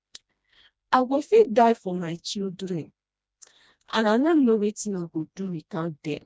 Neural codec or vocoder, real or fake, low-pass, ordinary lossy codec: codec, 16 kHz, 1 kbps, FreqCodec, smaller model; fake; none; none